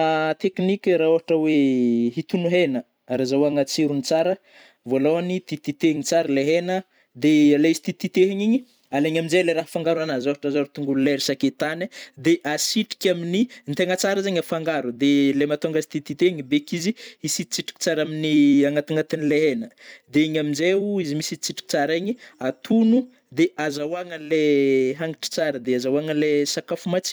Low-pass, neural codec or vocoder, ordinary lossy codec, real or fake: none; vocoder, 44.1 kHz, 128 mel bands every 256 samples, BigVGAN v2; none; fake